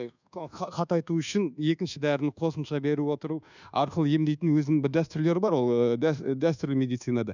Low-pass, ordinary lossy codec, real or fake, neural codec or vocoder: 7.2 kHz; none; fake; codec, 24 kHz, 1.2 kbps, DualCodec